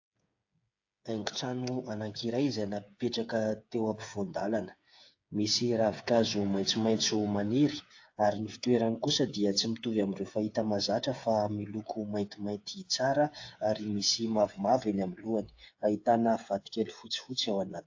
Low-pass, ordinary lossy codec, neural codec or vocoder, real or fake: 7.2 kHz; AAC, 48 kbps; codec, 16 kHz, 8 kbps, FreqCodec, smaller model; fake